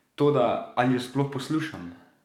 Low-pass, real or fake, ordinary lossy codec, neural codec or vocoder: 19.8 kHz; fake; none; codec, 44.1 kHz, 7.8 kbps, DAC